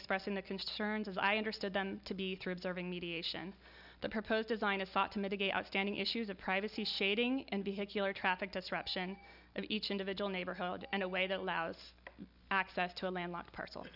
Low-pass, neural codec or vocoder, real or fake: 5.4 kHz; none; real